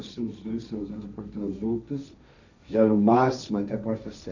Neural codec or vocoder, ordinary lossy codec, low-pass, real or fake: codec, 16 kHz, 1.1 kbps, Voila-Tokenizer; none; 7.2 kHz; fake